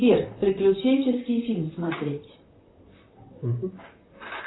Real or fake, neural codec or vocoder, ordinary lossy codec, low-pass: fake; vocoder, 44.1 kHz, 128 mel bands, Pupu-Vocoder; AAC, 16 kbps; 7.2 kHz